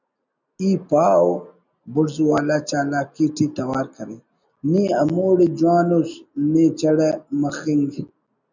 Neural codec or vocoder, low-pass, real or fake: none; 7.2 kHz; real